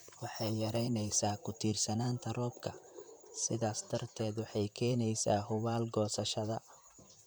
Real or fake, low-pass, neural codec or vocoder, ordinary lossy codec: fake; none; vocoder, 44.1 kHz, 128 mel bands, Pupu-Vocoder; none